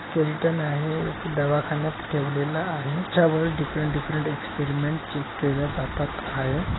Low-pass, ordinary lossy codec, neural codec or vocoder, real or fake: 7.2 kHz; AAC, 16 kbps; none; real